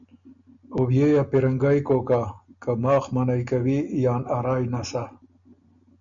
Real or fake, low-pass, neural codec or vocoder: real; 7.2 kHz; none